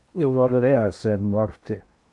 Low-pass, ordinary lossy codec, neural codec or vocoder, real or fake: 10.8 kHz; AAC, 64 kbps; codec, 16 kHz in and 24 kHz out, 0.8 kbps, FocalCodec, streaming, 65536 codes; fake